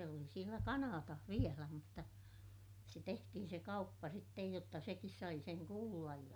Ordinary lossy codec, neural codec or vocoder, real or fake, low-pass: none; none; real; none